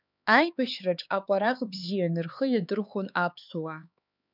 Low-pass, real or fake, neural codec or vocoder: 5.4 kHz; fake; codec, 16 kHz, 4 kbps, X-Codec, HuBERT features, trained on LibriSpeech